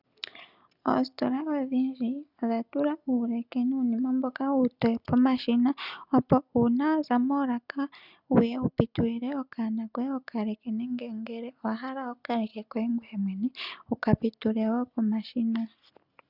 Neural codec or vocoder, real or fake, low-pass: none; real; 5.4 kHz